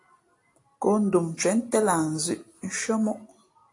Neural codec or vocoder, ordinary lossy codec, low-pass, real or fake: none; AAC, 48 kbps; 10.8 kHz; real